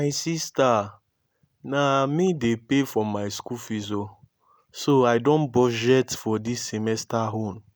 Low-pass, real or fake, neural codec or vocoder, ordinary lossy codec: none; real; none; none